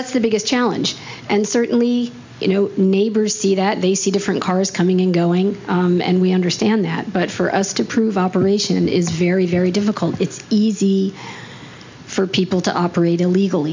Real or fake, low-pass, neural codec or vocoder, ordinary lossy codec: real; 7.2 kHz; none; MP3, 64 kbps